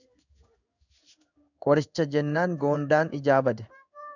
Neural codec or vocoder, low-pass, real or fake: codec, 16 kHz in and 24 kHz out, 1 kbps, XY-Tokenizer; 7.2 kHz; fake